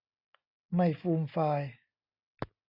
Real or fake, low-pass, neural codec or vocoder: real; 5.4 kHz; none